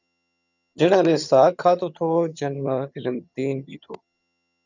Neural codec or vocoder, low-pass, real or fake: vocoder, 22.05 kHz, 80 mel bands, HiFi-GAN; 7.2 kHz; fake